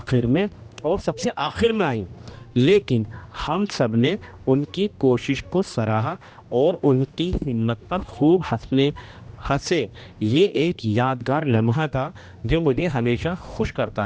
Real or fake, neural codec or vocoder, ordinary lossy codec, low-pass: fake; codec, 16 kHz, 1 kbps, X-Codec, HuBERT features, trained on general audio; none; none